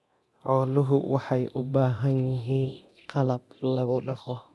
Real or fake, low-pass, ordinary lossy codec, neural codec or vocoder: fake; none; none; codec, 24 kHz, 0.9 kbps, DualCodec